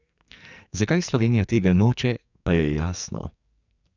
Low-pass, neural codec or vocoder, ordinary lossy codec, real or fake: 7.2 kHz; codec, 44.1 kHz, 2.6 kbps, SNAC; none; fake